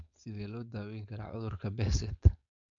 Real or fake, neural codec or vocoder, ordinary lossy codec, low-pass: fake; codec, 16 kHz, 4.8 kbps, FACodec; MP3, 64 kbps; 7.2 kHz